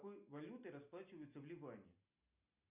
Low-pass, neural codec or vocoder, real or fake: 3.6 kHz; none; real